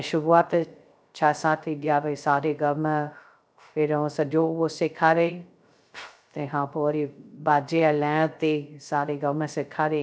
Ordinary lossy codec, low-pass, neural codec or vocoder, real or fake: none; none; codec, 16 kHz, 0.3 kbps, FocalCodec; fake